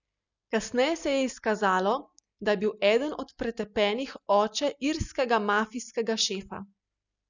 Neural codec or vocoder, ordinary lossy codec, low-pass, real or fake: none; none; 7.2 kHz; real